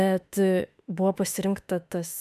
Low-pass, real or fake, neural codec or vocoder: 14.4 kHz; fake; autoencoder, 48 kHz, 32 numbers a frame, DAC-VAE, trained on Japanese speech